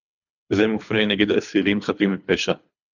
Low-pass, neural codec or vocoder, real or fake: 7.2 kHz; codec, 24 kHz, 3 kbps, HILCodec; fake